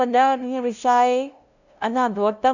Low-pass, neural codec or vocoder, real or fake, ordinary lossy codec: 7.2 kHz; codec, 16 kHz, 0.5 kbps, FunCodec, trained on LibriTTS, 25 frames a second; fake; none